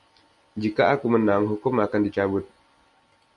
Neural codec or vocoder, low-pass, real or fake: none; 10.8 kHz; real